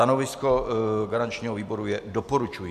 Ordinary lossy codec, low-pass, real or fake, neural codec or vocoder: AAC, 96 kbps; 14.4 kHz; real; none